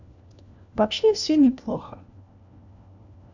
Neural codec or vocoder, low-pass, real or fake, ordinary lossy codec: codec, 16 kHz, 1 kbps, FunCodec, trained on LibriTTS, 50 frames a second; 7.2 kHz; fake; none